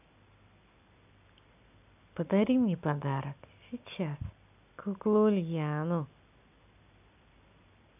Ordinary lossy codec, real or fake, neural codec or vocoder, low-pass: none; real; none; 3.6 kHz